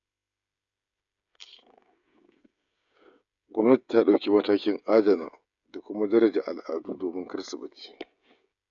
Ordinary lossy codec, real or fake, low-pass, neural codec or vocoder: none; fake; 7.2 kHz; codec, 16 kHz, 16 kbps, FreqCodec, smaller model